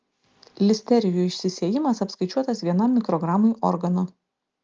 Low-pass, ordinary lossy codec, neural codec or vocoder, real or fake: 7.2 kHz; Opus, 24 kbps; none; real